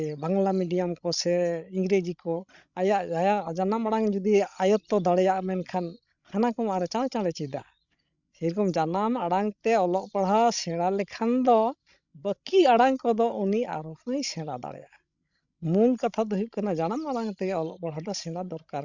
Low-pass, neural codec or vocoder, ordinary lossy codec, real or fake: 7.2 kHz; codec, 16 kHz, 16 kbps, FreqCodec, larger model; none; fake